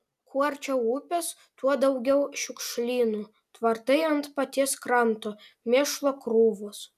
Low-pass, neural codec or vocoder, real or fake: 14.4 kHz; none; real